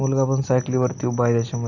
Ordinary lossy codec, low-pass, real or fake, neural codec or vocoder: none; 7.2 kHz; real; none